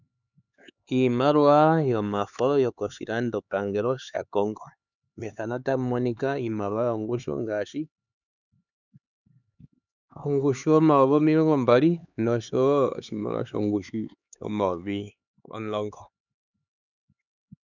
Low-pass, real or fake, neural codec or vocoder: 7.2 kHz; fake; codec, 16 kHz, 4 kbps, X-Codec, HuBERT features, trained on LibriSpeech